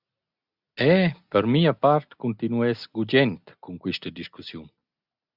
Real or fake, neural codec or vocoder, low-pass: real; none; 5.4 kHz